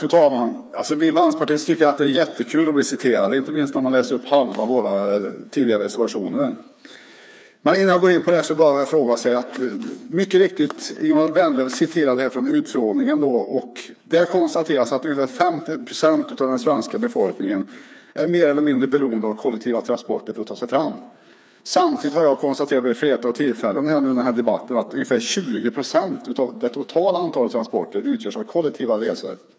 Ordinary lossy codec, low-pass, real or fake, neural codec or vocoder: none; none; fake; codec, 16 kHz, 2 kbps, FreqCodec, larger model